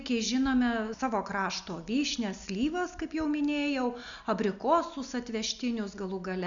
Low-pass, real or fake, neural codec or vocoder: 7.2 kHz; real; none